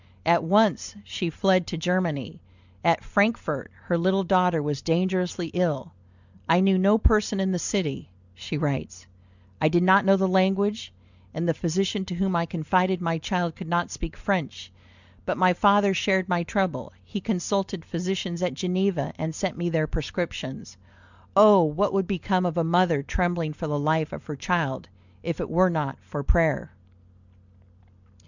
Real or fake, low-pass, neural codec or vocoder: real; 7.2 kHz; none